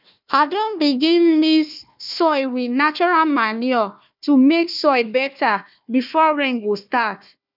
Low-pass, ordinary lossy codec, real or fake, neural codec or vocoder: 5.4 kHz; none; fake; codec, 16 kHz, 1 kbps, FunCodec, trained on Chinese and English, 50 frames a second